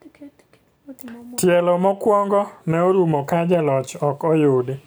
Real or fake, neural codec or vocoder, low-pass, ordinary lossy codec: real; none; none; none